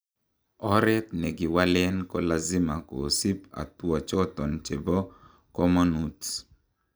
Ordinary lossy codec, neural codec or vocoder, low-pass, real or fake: none; none; none; real